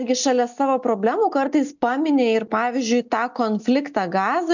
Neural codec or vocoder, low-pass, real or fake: none; 7.2 kHz; real